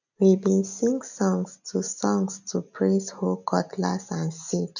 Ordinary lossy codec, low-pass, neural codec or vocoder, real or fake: none; 7.2 kHz; none; real